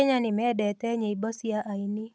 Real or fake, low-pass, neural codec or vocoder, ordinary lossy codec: real; none; none; none